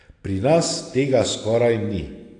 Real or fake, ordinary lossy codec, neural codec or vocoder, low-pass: real; AAC, 48 kbps; none; 9.9 kHz